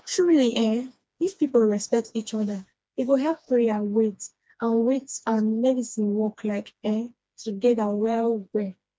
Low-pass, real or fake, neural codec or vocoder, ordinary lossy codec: none; fake; codec, 16 kHz, 2 kbps, FreqCodec, smaller model; none